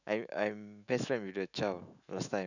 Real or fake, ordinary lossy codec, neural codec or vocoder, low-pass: fake; none; autoencoder, 48 kHz, 128 numbers a frame, DAC-VAE, trained on Japanese speech; 7.2 kHz